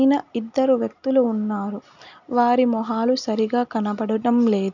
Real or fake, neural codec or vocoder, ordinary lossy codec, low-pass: real; none; none; 7.2 kHz